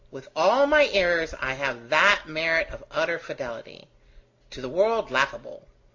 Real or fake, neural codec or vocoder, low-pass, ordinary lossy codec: real; none; 7.2 kHz; AAC, 48 kbps